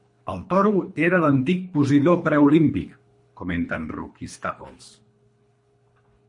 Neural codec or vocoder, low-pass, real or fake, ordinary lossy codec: codec, 24 kHz, 3 kbps, HILCodec; 10.8 kHz; fake; MP3, 48 kbps